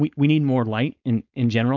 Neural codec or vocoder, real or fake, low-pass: codec, 16 kHz, 4.8 kbps, FACodec; fake; 7.2 kHz